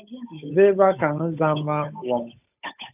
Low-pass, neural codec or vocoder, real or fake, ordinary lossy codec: 3.6 kHz; codec, 16 kHz, 8 kbps, FunCodec, trained on Chinese and English, 25 frames a second; fake; AAC, 32 kbps